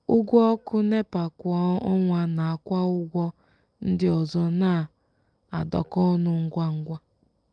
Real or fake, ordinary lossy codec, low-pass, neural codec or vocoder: real; Opus, 32 kbps; 9.9 kHz; none